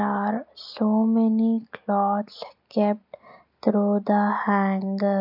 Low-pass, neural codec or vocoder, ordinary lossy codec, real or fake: 5.4 kHz; none; none; real